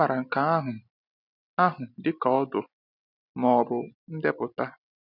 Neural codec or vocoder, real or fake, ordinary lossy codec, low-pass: none; real; none; 5.4 kHz